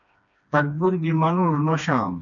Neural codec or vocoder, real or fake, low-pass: codec, 16 kHz, 2 kbps, FreqCodec, smaller model; fake; 7.2 kHz